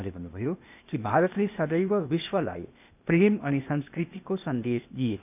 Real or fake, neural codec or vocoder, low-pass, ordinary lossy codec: fake; codec, 16 kHz, 0.8 kbps, ZipCodec; 3.6 kHz; none